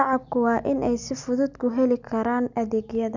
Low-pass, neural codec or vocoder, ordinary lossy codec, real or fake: 7.2 kHz; none; none; real